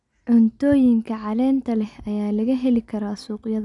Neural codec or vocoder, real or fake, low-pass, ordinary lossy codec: none; real; 10.8 kHz; none